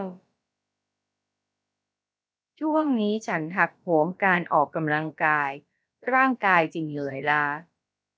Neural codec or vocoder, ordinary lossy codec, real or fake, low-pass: codec, 16 kHz, about 1 kbps, DyCAST, with the encoder's durations; none; fake; none